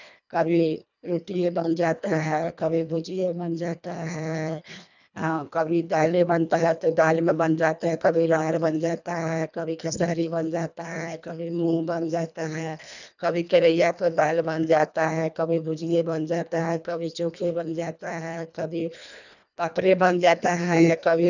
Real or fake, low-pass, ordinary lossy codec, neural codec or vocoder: fake; 7.2 kHz; none; codec, 24 kHz, 1.5 kbps, HILCodec